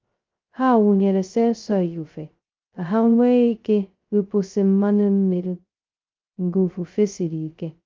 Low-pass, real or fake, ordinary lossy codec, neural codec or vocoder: 7.2 kHz; fake; Opus, 24 kbps; codec, 16 kHz, 0.2 kbps, FocalCodec